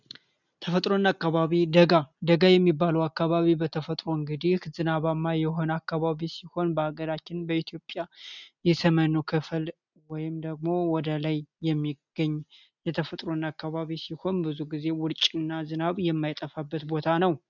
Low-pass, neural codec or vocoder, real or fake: 7.2 kHz; none; real